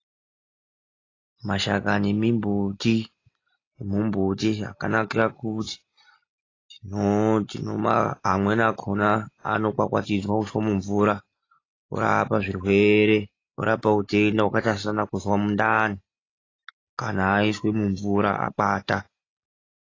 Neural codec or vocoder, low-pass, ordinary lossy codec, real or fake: none; 7.2 kHz; AAC, 32 kbps; real